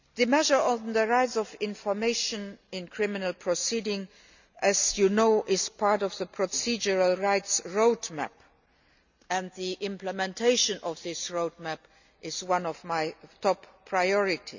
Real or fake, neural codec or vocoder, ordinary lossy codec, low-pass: real; none; none; 7.2 kHz